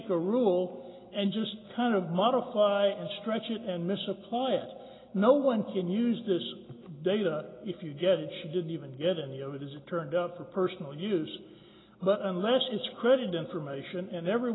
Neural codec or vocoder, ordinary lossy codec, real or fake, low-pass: none; AAC, 16 kbps; real; 7.2 kHz